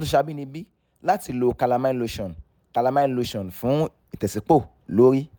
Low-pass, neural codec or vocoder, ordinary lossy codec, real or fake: none; none; none; real